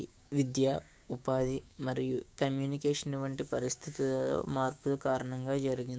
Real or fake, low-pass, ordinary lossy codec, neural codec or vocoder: fake; none; none; codec, 16 kHz, 6 kbps, DAC